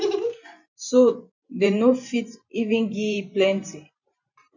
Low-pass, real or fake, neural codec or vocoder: 7.2 kHz; fake; vocoder, 44.1 kHz, 128 mel bands every 512 samples, BigVGAN v2